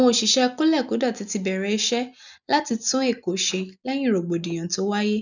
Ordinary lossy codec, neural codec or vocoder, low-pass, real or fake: none; none; 7.2 kHz; real